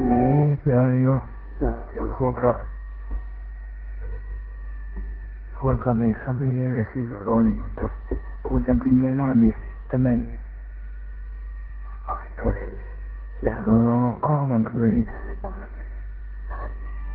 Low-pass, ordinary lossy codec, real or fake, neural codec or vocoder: 5.4 kHz; Opus, 16 kbps; fake; codec, 16 kHz in and 24 kHz out, 0.9 kbps, LongCat-Audio-Codec, four codebook decoder